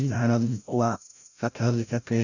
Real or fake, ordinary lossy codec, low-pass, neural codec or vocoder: fake; none; 7.2 kHz; codec, 16 kHz, 0.5 kbps, FreqCodec, larger model